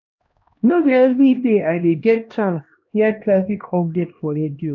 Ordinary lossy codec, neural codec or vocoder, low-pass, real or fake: none; codec, 16 kHz, 1 kbps, X-Codec, HuBERT features, trained on LibriSpeech; 7.2 kHz; fake